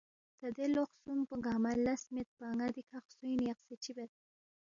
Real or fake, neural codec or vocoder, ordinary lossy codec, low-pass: real; none; MP3, 96 kbps; 7.2 kHz